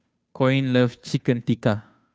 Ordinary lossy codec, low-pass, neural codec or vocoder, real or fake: none; none; codec, 16 kHz, 2 kbps, FunCodec, trained on Chinese and English, 25 frames a second; fake